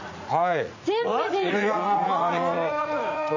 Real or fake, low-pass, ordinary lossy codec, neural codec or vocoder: fake; 7.2 kHz; none; vocoder, 44.1 kHz, 80 mel bands, Vocos